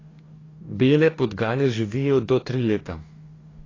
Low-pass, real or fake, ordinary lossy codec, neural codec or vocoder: 7.2 kHz; fake; AAC, 32 kbps; codec, 44.1 kHz, 2.6 kbps, DAC